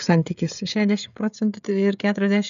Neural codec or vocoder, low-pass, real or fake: codec, 16 kHz, 16 kbps, FreqCodec, smaller model; 7.2 kHz; fake